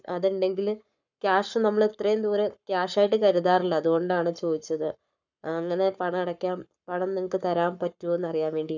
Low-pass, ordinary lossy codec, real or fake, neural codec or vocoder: 7.2 kHz; none; fake; codec, 44.1 kHz, 7.8 kbps, Pupu-Codec